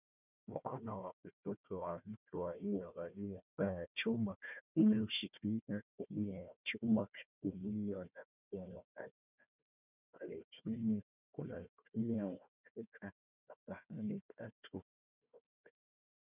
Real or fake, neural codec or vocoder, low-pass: fake; codec, 16 kHz, 1 kbps, FunCodec, trained on Chinese and English, 50 frames a second; 3.6 kHz